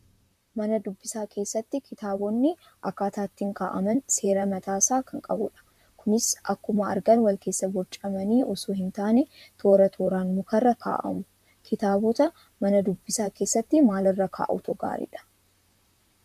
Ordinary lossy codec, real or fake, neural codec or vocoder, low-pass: MP3, 96 kbps; fake; vocoder, 44.1 kHz, 128 mel bands, Pupu-Vocoder; 14.4 kHz